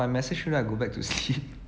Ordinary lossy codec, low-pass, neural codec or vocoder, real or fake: none; none; none; real